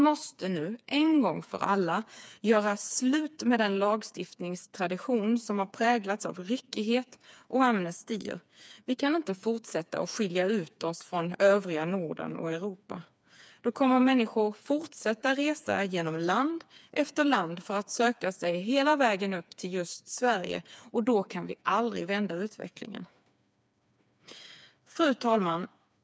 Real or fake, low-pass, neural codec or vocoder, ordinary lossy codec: fake; none; codec, 16 kHz, 4 kbps, FreqCodec, smaller model; none